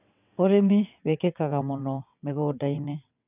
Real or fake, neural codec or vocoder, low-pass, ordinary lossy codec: fake; vocoder, 22.05 kHz, 80 mel bands, WaveNeXt; 3.6 kHz; none